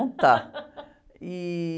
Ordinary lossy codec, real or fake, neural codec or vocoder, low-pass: none; real; none; none